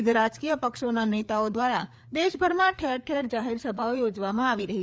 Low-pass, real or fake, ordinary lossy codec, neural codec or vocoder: none; fake; none; codec, 16 kHz, 4 kbps, FreqCodec, larger model